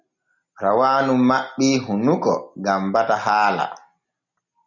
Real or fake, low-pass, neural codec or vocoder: real; 7.2 kHz; none